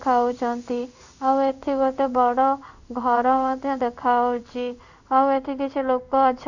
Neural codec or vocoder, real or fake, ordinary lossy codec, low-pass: codec, 16 kHz in and 24 kHz out, 1 kbps, XY-Tokenizer; fake; none; 7.2 kHz